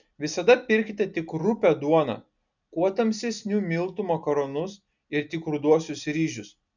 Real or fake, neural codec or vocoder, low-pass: real; none; 7.2 kHz